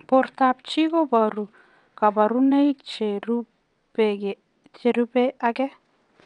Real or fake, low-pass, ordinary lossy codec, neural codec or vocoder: fake; 9.9 kHz; none; vocoder, 22.05 kHz, 80 mel bands, WaveNeXt